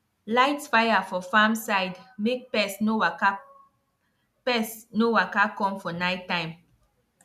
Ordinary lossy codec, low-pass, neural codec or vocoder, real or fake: none; 14.4 kHz; none; real